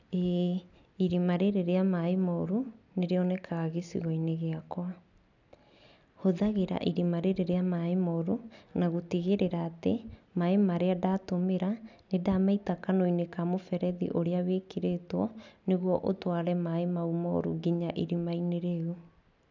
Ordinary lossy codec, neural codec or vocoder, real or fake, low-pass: none; none; real; 7.2 kHz